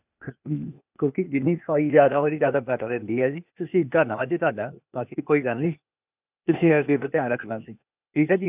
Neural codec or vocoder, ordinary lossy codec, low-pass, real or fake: codec, 16 kHz, 0.8 kbps, ZipCodec; none; 3.6 kHz; fake